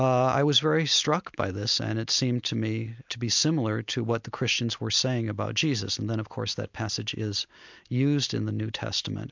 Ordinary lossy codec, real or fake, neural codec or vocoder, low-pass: MP3, 64 kbps; real; none; 7.2 kHz